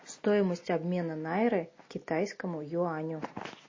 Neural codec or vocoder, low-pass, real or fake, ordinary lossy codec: none; 7.2 kHz; real; MP3, 32 kbps